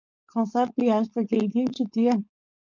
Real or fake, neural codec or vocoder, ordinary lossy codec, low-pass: fake; codec, 16 kHz, 4.8 kbps, FACodec; MP3, 48 kbps; 7.2 kHz